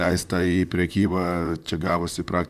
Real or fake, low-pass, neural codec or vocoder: fake; 14.4 kHz; vocoder, 44.1 kHz, 128 mel bands, Pupu-Vocoder